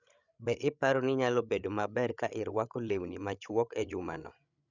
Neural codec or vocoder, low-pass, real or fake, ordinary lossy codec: codec, 16 kHz, 8 kbps, FreqCodec, larger model; 7.2 kHz; fake; none